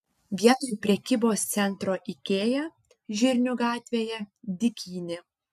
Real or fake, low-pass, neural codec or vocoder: real; 14.4 kHz; none